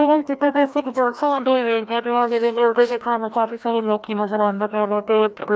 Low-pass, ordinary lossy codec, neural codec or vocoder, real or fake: none; none; codec, 16 kHz, 1 kbps, FreqCodec, larger model; fake